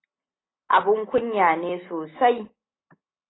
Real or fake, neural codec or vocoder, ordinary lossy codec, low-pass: real; none; AAC, 16 kbps; 7.2 kHz